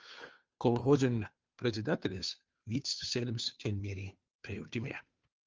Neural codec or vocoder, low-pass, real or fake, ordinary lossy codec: codec, 16 kHz, 2 kbps, FunCodec, trained on Chinese and English, 25 frames a second; 7.2 kHz; fake; Opus, 24 kbps